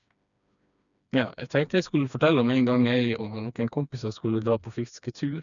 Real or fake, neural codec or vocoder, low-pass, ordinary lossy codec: fake; codec, 16 kHz, 2 kbps, FreqCodec, smaller model; 7.2 kHz; none